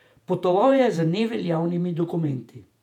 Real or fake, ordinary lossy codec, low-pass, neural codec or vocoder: fake; none; 19.8 kHz; vocoder, 48 kHz, 128 mel bands, Vocos